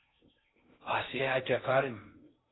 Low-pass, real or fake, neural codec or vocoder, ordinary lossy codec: 7.2 kHz; fake; codec, 16 kHz in and 24 kHz out, 0.6 kbps, FocalCodec, streaming, 2048 codes; AAC, 16 kbps